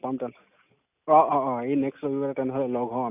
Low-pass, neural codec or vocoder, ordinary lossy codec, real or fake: 3.6 kHz; none; none; real